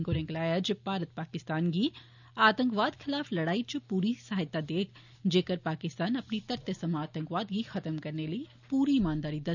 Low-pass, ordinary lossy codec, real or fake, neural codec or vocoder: 7.2 kHz; none; real; none